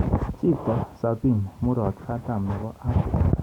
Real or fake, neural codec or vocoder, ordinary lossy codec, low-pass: real; none; none; 19.8 kHz